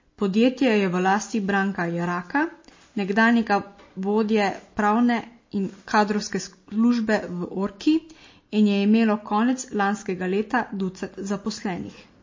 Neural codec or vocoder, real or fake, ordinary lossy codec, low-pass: none; real; MP3, 32 kbps; 7.2 kHz